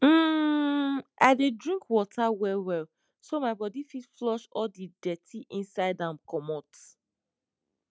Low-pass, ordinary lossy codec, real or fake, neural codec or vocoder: none; none; real; none